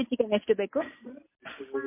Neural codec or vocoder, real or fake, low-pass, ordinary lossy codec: none; real; 3.6 kHz; MP3, 24 kbps